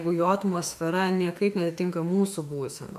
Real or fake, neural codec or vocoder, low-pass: fake; autoencoder, 48 kHz, 32 numbers a frame, DAC-VAE, trained on Japanese speech; 14.4 kHz